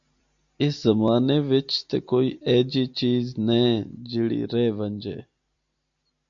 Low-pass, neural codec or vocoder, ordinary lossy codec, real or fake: 7.2 kHz; none; MP3, 64 kbps; real